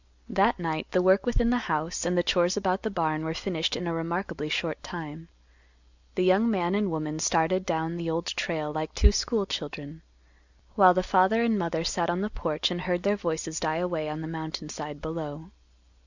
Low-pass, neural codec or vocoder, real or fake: 7.2 kHz; none; real